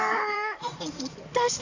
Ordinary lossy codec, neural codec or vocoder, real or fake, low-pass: AAC, 48 kbps; codec, 16 kHz in and 24 kHz out, 2.2 kbps, FireRedTTS-2 codec; fake; 7.2 kHz